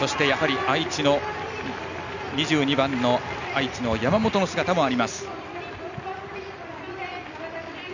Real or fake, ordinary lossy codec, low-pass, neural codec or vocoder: real; none; 7.2 kHz; none